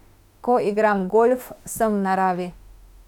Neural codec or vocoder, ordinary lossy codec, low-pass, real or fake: autoencoder, 48 kHz, 32 numbers a frame, DAC-VAE, trained on Japanese speech; none; 19.8 kHz; fake